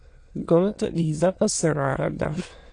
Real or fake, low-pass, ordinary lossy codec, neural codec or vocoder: fake; 9.9 kHz; AAC, 48 kbps; autoencoder, 22.05 kHz, a latent of 192 numbers a frame, VITS, trained on many speakers